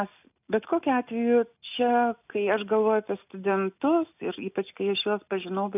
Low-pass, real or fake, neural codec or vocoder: 3.6 kHz; real; none